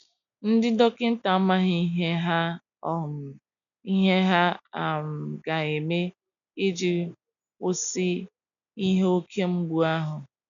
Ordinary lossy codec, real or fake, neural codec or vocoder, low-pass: none; real; none; 7.2 kHz